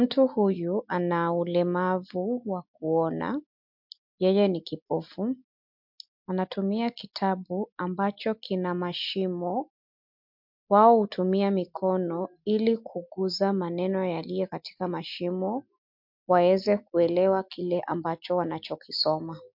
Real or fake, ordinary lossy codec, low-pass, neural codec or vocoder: real; MP3, 48 kbps; 5.4 kHz; none